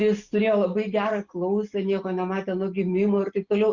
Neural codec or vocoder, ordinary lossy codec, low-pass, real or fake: none; Opus, 64 kbps; 7.2 kHz; real